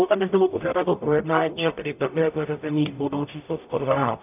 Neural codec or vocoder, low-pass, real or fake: codec, 44.1 kHz, 0.9 kbps, DAC; 3.6 kHz; fake